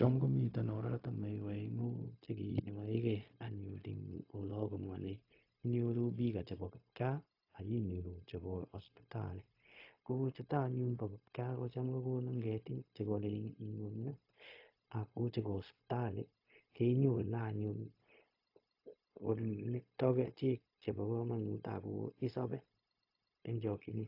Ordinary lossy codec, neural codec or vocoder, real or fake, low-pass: AAC, 32 kbps; codec, 16 kHz, 0.4 kbps, LongCat-Audio-Codec; fake; 5.4 kHz